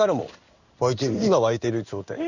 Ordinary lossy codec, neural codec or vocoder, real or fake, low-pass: none; codec, 16 kHz in and 24 kHz out, 1 kbps, XY-Tokenizer; fake; 7.2 kHz